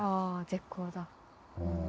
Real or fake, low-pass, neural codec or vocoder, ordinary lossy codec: real; none; none; none